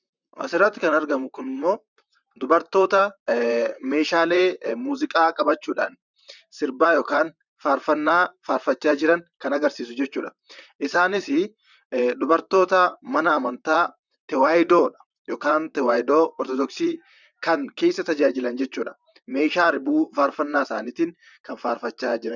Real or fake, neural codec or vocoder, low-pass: fake; vocoder, 44.1 kHz, 128 mel bands, Pupu-Vocoder; 7.2 kHz